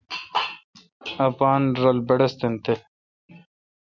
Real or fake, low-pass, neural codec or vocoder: real; 7.2 kHz; none